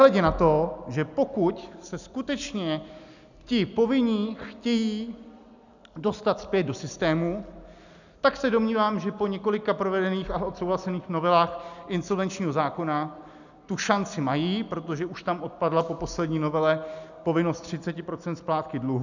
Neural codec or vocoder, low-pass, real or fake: none; 7.2 kHz; real